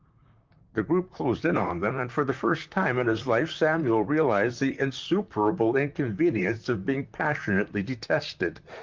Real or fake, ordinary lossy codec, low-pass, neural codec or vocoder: fake; Opus, 24 kbps; 7.2 kHz; vocoder, 44.1 kHz, 128 mel bands, Pupu-Vocoder